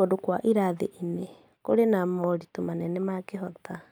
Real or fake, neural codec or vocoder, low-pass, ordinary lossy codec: real; none; none; none